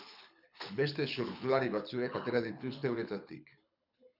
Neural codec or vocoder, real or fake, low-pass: codec, 24 kHz, 6 kbps, HILCodec; fake; 5.4 kHz